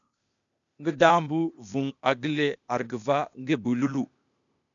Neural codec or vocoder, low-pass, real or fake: codec, 16 kHz, 0.8 kbps, ZipCodec; 7.2 kHz; fake